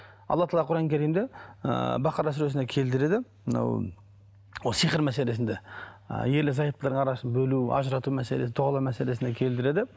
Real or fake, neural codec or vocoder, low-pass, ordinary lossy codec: real; none; none; none